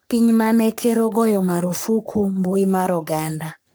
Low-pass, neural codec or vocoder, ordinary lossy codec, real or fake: none; codec, 44.1 kHz, 3.4 kbps, Pupu-Codec; none; fake